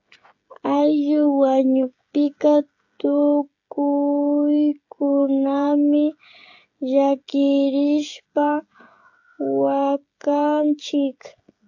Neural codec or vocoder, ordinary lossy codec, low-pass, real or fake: codec, 16 kHz, 16 kbps, FreqCodec, smaller model; AAC, 48 kbps; 7.2 kHz; fake